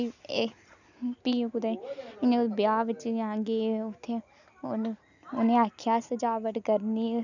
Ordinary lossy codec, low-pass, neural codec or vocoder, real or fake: none; 7.2 kHz; none; real